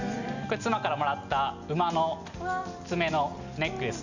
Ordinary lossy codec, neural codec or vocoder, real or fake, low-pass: none; none; real; 7.2 kHz